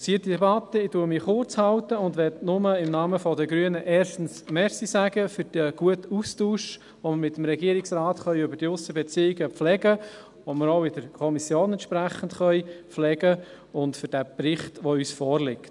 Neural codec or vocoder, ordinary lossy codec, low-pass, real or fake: none; none; 10.8 kHz; real